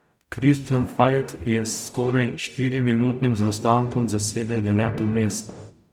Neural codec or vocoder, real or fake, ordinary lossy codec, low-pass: codec, 44.1 kHz, 0.9 kbps, DAC; fake; none; 19.8 kHz